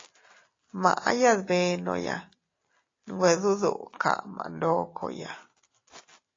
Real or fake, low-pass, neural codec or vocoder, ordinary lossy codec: real; 7.2 kHz; none; AAC, 32 kbps